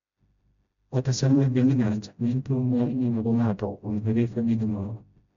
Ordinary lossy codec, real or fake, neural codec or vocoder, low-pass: MP3, 64 kbps; fake; codec, 16 kHz, 0.5 kbps, FreqCodec, smaller model; 7.2 kHz